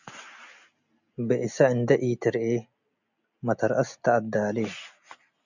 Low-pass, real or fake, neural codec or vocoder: 7.2 kHz; real; none